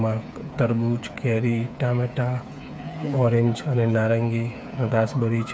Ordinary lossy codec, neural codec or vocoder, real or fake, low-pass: none; codec, 16 kHz, 8 kbps, FreqCodec, smaller model; fake; none